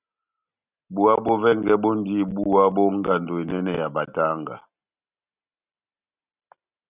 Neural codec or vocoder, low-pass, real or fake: none; 3.6 kHz; real